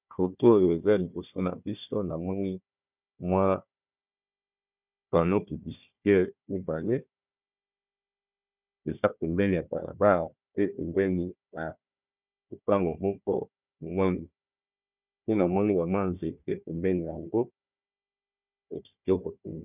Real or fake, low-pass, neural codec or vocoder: fake; 3.6 kHz; codec, 16 kHz, 1 kbps, FunCodec, trained on Chinese and English, 50 frames a second